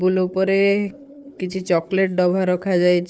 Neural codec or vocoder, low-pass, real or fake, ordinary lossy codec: codec, 16 kHz, 4 kbps, FunCodec, trained on Chinese and English, 50 frames a second; none; fake; none